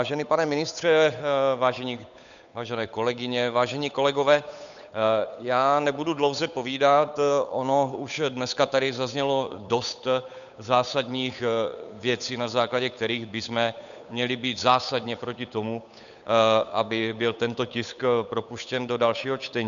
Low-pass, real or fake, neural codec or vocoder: 7.2 kHz; fake; codec, 16 kHz, 8 kbps, FunCodec, trained on Chinese and English, 25 frames a second